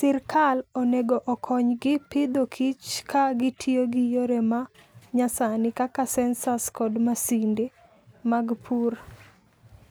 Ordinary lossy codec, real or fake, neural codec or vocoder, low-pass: none; real; none; none